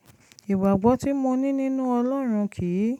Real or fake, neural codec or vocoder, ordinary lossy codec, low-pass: real; none; none; 19.8 kHz